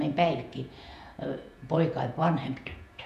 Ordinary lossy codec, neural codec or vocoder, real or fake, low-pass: none; vocoder, 48 kHz, 128 mel bands, Vocos; fake; 14.4 kHz